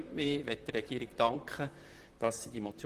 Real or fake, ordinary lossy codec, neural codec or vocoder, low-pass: fake; Opus, 24 kbps; vocoder, 44.1 kHz, 128 mel bands, Pupu-Vocoder; 14.4 kHz